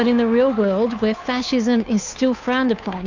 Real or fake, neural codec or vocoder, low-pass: fake; codec, 16 kHz, 2 kbps, FunCodec, trained on Chinese and English, 25 frames a second; 7.2 kHz